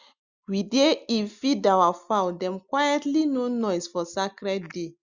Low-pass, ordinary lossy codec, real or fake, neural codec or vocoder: 7.2 kHz; Opus, 64 kbps; real; none